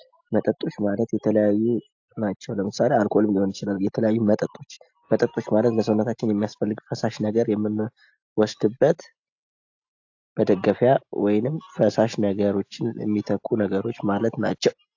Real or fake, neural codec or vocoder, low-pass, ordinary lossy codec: real; none; 7.2 kHz; AAC, 48 kbps